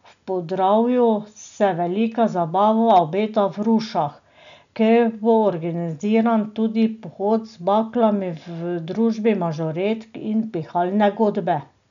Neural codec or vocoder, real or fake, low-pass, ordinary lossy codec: none; real; 7.2 kHz; none